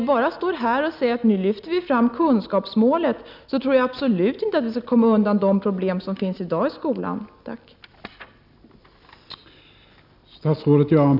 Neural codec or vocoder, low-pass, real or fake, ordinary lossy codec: none; 5.4 kHz; real; none